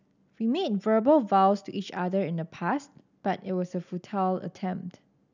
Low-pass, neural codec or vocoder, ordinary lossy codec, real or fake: 7.2 kHz; none; none; real